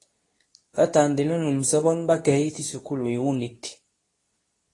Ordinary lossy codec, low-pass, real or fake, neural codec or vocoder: AAC, 32 kbps; 10.8 kHz; fake; codec, 24 kHz, 0.9 kbps, WavTokenizer, medium speech release version 2